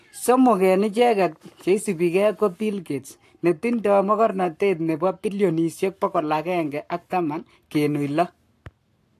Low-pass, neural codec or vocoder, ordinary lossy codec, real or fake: 14.4 kHz; codec, 44.1 kHz, 7.8 kbps, Pupu-Codec; AAC, 64 kbps; fake